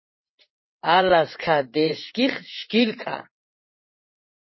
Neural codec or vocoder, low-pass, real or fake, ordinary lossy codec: vocoder, 22.05 kHz, 80 mel bands, WaveNeXt; 7.2 kHz; fake; MP3, 24 kbps